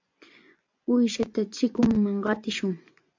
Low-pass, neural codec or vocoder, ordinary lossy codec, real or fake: 7.2 kHz; vocoder, 24 kHz, 100 mel bands, Vocos; MP3, 64 kbps; fake